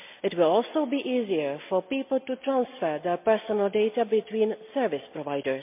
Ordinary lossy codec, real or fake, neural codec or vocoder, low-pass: MP3, 32 kbps; real; none; 3.6 kHz